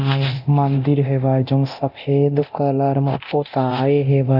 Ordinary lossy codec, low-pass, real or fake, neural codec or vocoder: none; 5.4 kHz; fake; codec, 24 kHz, 0.9 kbps, DualCodec